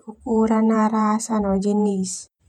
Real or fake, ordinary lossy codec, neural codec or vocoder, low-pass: fake; none; vocoder, 48 kHz, 128 mel bands, Vocos; 19.8 kHz